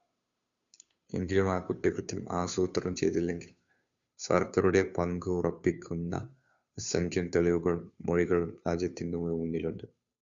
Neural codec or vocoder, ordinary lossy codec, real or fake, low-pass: codec, 16 kHz, 2 kbps, FunCodec, trained on Chinese and English, 25 frames a second; Opus, 64 kbps; fake; 7.2 kHz